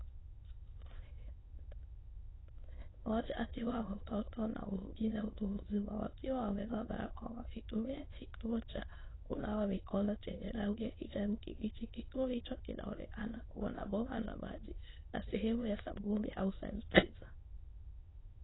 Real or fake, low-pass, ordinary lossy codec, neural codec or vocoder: fake; 7.2 kHz; AAC, 16 kbps; autoencoder, 22.05 kHz, a latent of 192 numbers a frame, VITS, trained on many speakers